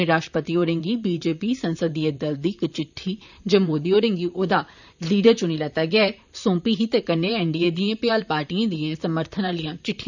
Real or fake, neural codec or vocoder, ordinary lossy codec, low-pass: fake; vocoder, 44.1 kHz, 128 mel bands, Pupu-Vocoder; none; 7.2 kHz